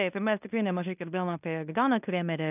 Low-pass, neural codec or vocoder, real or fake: 3.6 kHz; codec, 16 kHz in and 24 kHz out, 0.9 kbps, LongCat-Audio-Codec, fine tuned four codebook decoder; fake